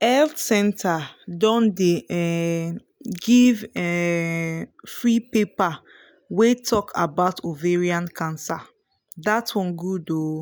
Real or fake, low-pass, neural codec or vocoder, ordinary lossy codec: real; none; none; none